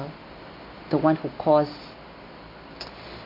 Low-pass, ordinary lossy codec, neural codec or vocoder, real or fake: 5.4 kHz; MP3, 32 kbps; none; real